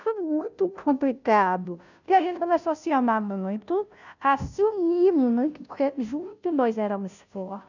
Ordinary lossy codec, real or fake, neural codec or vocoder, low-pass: none; fake; codec, 16 kHz, 0.5 kbps, FunCodec, trained on Chinese and English, 25 frames a second; 7.2 kHz